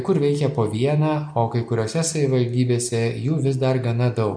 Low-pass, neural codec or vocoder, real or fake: 9.9 kHz; none; real